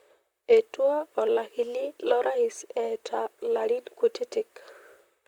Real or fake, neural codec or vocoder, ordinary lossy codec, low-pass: fake; vocoder, 44.1 kHz, 128 mel bands, Pupu-Vocoder; Opus, 64 kbps; 19.8 kHz